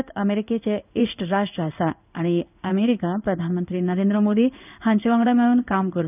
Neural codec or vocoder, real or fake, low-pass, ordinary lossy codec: codec, 16 kHz in and 24 kHz out, 1 kbps, XY-Tokenizer; fake; 3.6 kHz; none